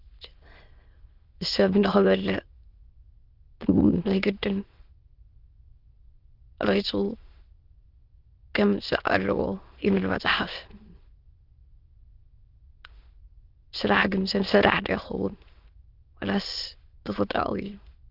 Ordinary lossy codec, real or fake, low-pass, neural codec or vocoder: Opus, 32 kbps; fake; 5.4 kHz; autoencoder, 22.05 kHz, a latent of 192 numbers a frame, VITS, trained on many speakers